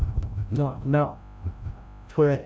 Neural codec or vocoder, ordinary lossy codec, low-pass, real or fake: codec, 16 kHz, 0.5 kbps, FreqCodec, larger model; none; none; fake